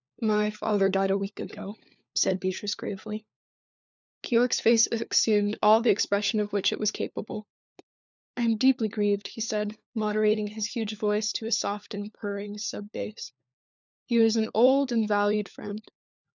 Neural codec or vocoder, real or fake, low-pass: codec, 16 kHz, 4 kbps, FunCodec, trained on LibriTTS, 50 frames a second; fake; 7.2 kHz